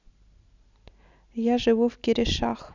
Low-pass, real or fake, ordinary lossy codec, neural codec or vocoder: 7.2 kHz; real; none; none